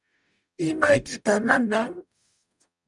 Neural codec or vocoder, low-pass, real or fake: codec, 44.1 kHz, 0.9 kbps, DAC; 10.8 kHz; fake